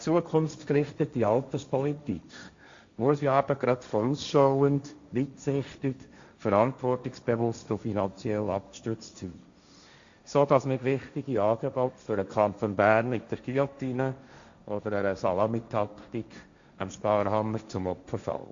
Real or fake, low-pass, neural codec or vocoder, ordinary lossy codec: fake; 7.2 kHz; codec, 16 kHz, 1.1 kbps, Voila-Tokenizer; Opus, 64 kbps